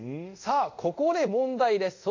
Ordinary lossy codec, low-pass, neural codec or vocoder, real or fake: AAC, 48 kbps; 7.2 kHz; codec, 24 kHz, 0.5 kbps, DualCodec; fake